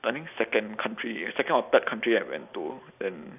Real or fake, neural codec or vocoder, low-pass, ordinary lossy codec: real; none; 3.6 kHz; none